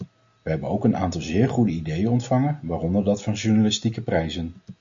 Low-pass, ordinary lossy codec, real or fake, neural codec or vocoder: 7.2 kHz; MP3, 48 kbps; real; none